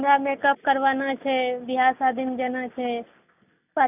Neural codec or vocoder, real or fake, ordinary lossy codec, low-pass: none; real; none; 3.6 kHz